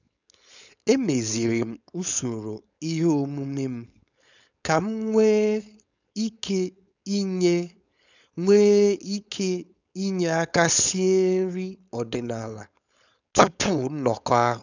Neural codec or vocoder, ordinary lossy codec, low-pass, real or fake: codec, 16 kHz, 4.8 kbps, FACodec; none; 7.2 kHz; fake